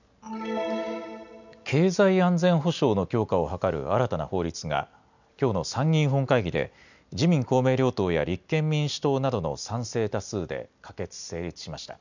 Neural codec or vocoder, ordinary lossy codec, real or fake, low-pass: none; none; real; 7.2 kHz